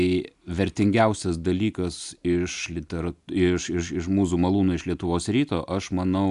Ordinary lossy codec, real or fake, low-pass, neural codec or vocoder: AAC, 96 kbps; real; 10.8 kHz; none